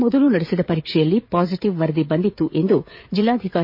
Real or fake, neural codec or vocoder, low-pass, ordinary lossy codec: fake; codec, 16 kHz, 16 kbps, FreqCodec, smaller model; 5.4 kHz; MP3, 24 kbps